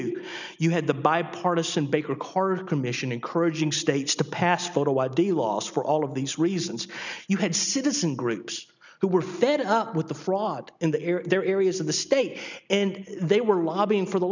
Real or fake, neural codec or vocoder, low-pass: real; none; 7.2 kHz